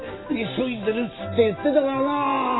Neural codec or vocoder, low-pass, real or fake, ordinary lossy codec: none; 7.2 kHz; real; AAC, 16 kbps